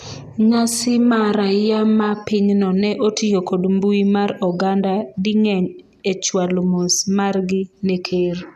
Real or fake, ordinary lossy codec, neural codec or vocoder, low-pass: real; none; none; 14.4 kHz